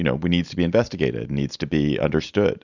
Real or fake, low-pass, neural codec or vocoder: real; 7.2 kHz; none